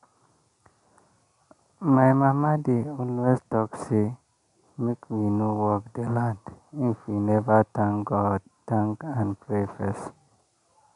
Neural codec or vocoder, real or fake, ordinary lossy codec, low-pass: none; real; none; 10.8 kHz